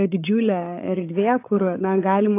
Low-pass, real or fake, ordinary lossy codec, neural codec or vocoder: 3.6 kHz; fake; AAC, 24 kbps; codec, 16 kHz, 16 kbps, FreqCodec, larger model